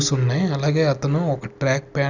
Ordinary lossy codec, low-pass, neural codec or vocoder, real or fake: none; 7.2 kHz; none; real